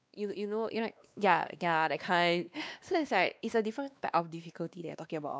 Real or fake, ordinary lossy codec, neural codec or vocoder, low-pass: fake; none; codec, 16 kHz, 2 kbps, X-Codec, WavLM features, trained on Multilingual LibriSpeech; none